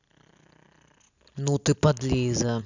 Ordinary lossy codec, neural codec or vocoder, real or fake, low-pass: none; none; real; 7.2 kHz